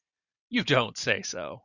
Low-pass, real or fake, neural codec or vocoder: 7.2 kHz; fake; vocoder, 44.1 kHz, 128 mel bands every 512 samples, BigVGAN v2